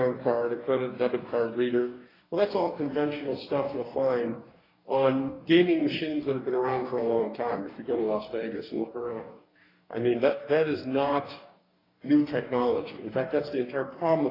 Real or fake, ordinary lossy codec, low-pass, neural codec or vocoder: fake; AAC, 24 kbps; 5.4 kHz; codec, 44.1 kHz, 2.6 kbps, DAC